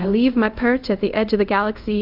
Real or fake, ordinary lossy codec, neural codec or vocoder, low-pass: fake; Opus, 24 kbps; codec, 24 kHz, 0.5 kbps, DualCodec; 5.4 kHz